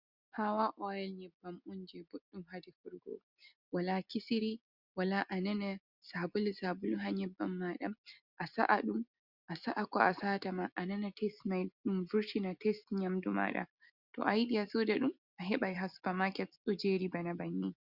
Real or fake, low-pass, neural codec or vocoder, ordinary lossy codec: real; 5.4 kHz; none; Opus, 64 kbps